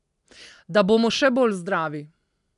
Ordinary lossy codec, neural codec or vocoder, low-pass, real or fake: none; none; 10.8 kHz; real